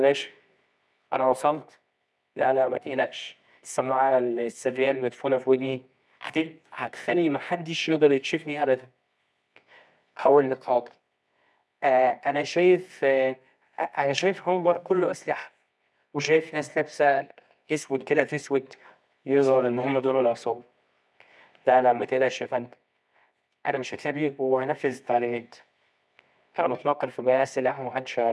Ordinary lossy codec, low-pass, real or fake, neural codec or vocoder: none; none; fake; codec, 24 kHz, 0.9 kbps, WavTokenizer, medium music audio release